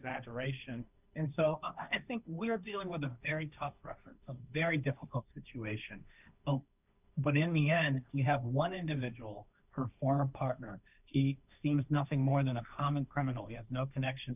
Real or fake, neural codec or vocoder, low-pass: fake; codec, 16 kHz, 1.1 kbps, Voila-Tokenizer; 3.6 kHz